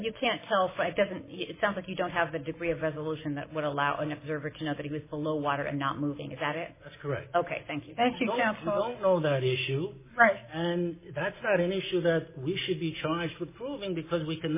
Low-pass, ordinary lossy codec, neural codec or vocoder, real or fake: 3.6 kHz; MP3, 16 kbps; none; real